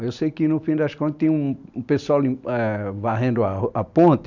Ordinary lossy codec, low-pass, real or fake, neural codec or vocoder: none; 7.2 kHz; real; none